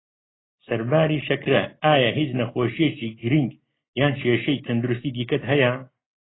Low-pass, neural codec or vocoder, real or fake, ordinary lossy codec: 7.2 kHz; none; real; AAC, 16 kbps